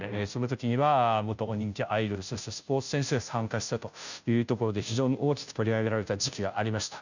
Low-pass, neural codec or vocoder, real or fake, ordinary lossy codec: 7.2 kHz; codec, 16 kHz, 0.5 kbps, FunCodec, trained on Chinese and English, 25 frames a second; fake; none